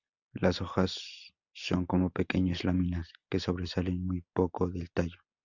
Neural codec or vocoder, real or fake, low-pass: none; real; 7.2 kHz